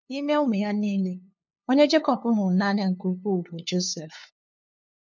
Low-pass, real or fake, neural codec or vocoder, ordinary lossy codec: none; fake; codec, 16 kHz, 2 kbps, FunCodec, trained on LibriTTS, 25 frames a second; none